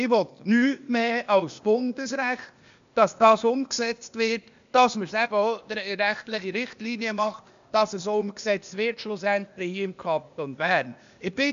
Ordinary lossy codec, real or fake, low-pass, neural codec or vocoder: MP3, 64 kbps; fake; 7.2 kHz; codec, 16 kHz, 0.8 kbps, ZipCodec